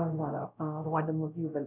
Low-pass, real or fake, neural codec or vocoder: 3.6 kHz; fake; codec, 16 kHz, 0.5 kbps, X-Codec, WavLM features, trained on Multilingual LibriSpeech